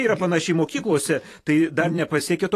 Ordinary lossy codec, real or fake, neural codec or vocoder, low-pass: AAC, 48 kbps; fake; vocoder, 44.1 kHz, 128 mel bands every 512 samples, BigVGAN v2; 14.4 kHz